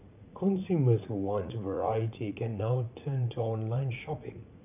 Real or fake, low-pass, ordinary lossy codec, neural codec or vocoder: fake; 3.6 kHz; none; vocoder, 44.1 kHz, 80 mel bands, Vocos